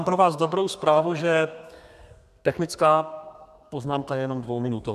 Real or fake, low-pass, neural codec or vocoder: fake; 14.4 kHz; codec, 32 kHz, 1.9 kbps, SNAC